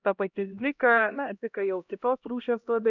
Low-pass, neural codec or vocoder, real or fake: 7.2 kHz; codec, 16 kHz, 1 kbps, X-Codec, HuBERT features, trained on LibriSpeech; fake